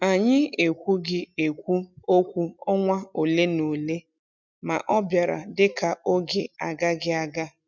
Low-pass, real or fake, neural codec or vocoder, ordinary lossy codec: 7.2 kHz; real; none; none